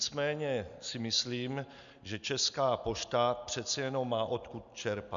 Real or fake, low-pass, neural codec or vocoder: real; 7.2 kHz; none